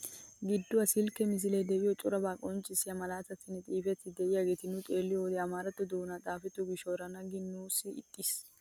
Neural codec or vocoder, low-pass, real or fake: none; 19.8 kHz; real